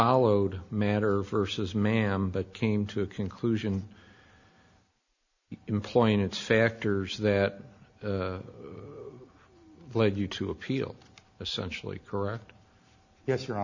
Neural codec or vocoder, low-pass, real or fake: none; 7.2 kHz; real